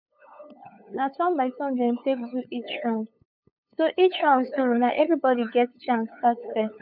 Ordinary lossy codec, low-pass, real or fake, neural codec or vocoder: none; 5.4 kHz; fake; codec, 16 kHz, 8 kbps, FunCodec, trained on LibriTTS, 25 frames a second